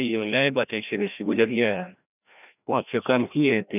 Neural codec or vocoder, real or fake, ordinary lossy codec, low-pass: codec, 16 kHz, 1 kbps, FreqCodec, larger model; fake; none; 3.6 kHz